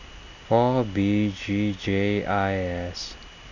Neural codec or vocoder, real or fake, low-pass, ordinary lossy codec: none; real; 7.2 kHz; none